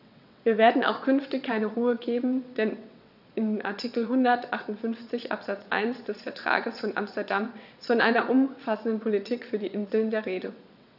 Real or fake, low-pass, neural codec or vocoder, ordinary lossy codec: fake; 5.4 kHz; vocoder, 22.05 kHz, 80 mel bands, WaveNeXt; none